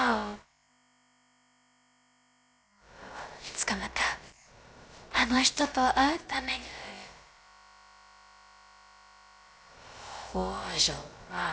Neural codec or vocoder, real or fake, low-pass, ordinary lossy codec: codec, 16 kHz, about 1 kbps, DyCAST, with the encoder's durations; fake; none; none